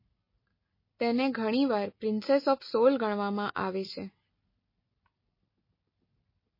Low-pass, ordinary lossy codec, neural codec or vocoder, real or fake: 5.4 kHz; MP3, 24 kbps; none; real